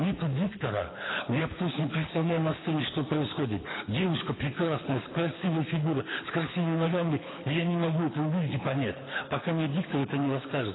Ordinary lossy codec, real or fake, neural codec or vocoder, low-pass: AAC, 16 kbps; fake; codec, 24 kHz, 6 kbps, HILCodec; 7.2 kHz